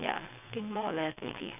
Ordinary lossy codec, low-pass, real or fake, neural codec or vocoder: none; 3.6 kHz; fake; vocoder, 22.05 kHz, 80 mel bands, WaveNeXt